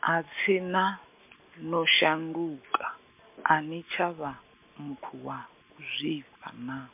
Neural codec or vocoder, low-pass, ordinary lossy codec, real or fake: none; 3.6 kHz; MP3, 32 kbps; real